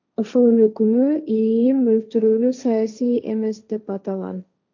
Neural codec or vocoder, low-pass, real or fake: codec, 16 kHz, 1.1 kbps, Voila-Tokenizer; 7.2 kHz; fake